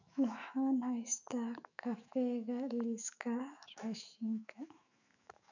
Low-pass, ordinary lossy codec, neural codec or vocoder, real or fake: 7.2 kHz; none; autoencoder, 48 kHz, 128 numbers a frame, DAC-VAE, trained on Japanese speech; fake